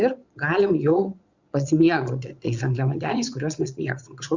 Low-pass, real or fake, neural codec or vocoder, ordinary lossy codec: 7.2 kHz; fake; vocoder, 22.05 kHz, 80 mel bands, Vocos; Opus, 64 kbps